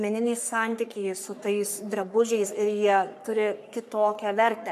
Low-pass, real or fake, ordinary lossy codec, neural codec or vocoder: 14.4 kHz; fake; MP3, 96 kbps; codec, 44.1 kHz, 3.4 kbps, Pupu-Codec